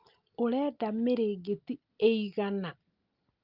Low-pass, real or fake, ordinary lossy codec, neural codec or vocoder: 5.4 kHz; real; Opus, 32 kbps; none